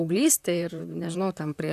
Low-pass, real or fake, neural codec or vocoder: 14.4 kHz; fake; vocoder, 44.1 kHz, 128 mel bands, Pupu-Vocoder